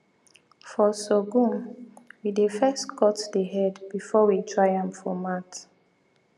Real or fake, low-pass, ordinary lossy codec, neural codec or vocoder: real; none; none; none